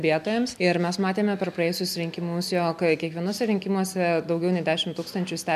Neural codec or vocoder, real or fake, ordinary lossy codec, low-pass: none; real; MP3, 96 kbps; 14.4 kHz